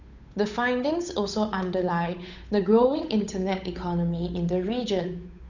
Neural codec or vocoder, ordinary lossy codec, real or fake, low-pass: codec, 16 kHz, 8 kbps, FunCodec, trained on Chinese and English, 25 frames a second; none; fake; 7.2 kHz